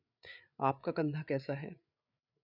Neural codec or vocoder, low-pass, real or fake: codec, 16 kHz, 8 kbps, FreqCodec, larger model; 5.4 kHz; fake